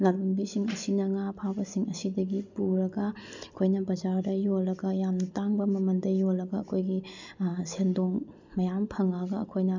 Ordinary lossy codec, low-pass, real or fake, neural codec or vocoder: none; 7.2 kHz; real; none